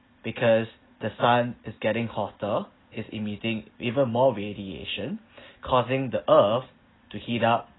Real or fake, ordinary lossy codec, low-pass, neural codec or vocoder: real; AAC, 16 kbps; 7.2 kHz; none